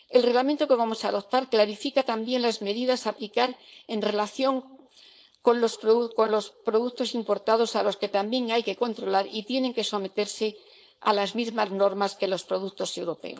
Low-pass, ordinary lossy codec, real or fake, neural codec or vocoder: none; none; fake; codec, 16 kHz, 4.8 kbps, FACodec